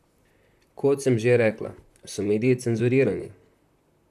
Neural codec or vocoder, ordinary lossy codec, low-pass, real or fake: vocoder, 44.1 kHz, 128 mel bands, Pupu-Vocoder; none; 14.4 kHz; fake